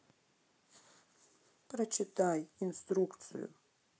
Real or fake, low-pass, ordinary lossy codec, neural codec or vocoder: real; none; none; none